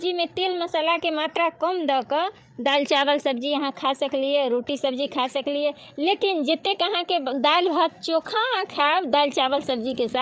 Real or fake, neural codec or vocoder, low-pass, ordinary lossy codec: fake; codec, 16 kHz, 8 kbps, FreqCodec, larger model; none; none